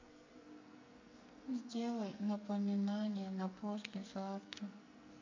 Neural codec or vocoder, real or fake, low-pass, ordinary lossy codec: codec, 44.1 kHz, 2.6 kbps, SNAC; fake; 7.2 kHz; MP3, 48 kbps